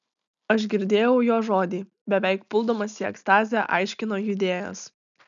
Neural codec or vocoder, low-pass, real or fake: none; 7.2 kHz; real